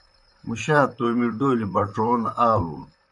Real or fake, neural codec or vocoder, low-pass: fake; vocoder, 44.1 kHz, 128 mel bands, Pupu-Vocoder; 10.8 kHz